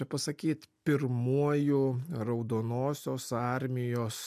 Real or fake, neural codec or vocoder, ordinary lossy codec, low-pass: real; none; MP3, 96 kbps; 14.4 kHz